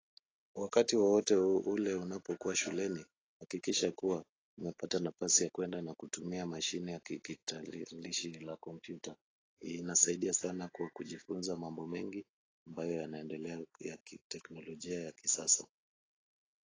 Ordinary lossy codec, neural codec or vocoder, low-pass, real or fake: AAC, 32 kbps; none; 7.2 kHz; real